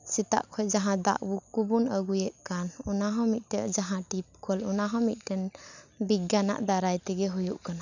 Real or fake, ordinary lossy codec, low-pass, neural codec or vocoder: real; none; 7.2 kHz; none